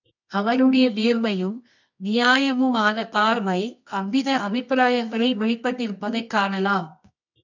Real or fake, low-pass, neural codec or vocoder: fake; 7.2 kHz; codec, 24 kHz, 0.9 kbps, WavTokenizer, medium music audio release